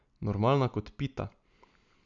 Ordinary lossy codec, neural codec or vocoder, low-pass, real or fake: none; none; 7.2 kHz; real